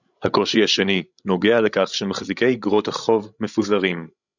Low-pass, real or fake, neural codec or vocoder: 7.2 kHz; fake; codec, 16 kHz, 16 kbps, FreqCodec, larger model